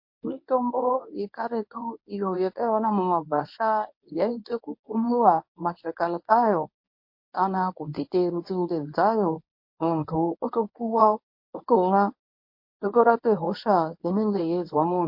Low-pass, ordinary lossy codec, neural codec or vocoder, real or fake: 5.4 kHz; MP3, 32 kbps; codec, 24 kHz, 0.9 kbps, WavTokenizer, medium speech release version 1; fake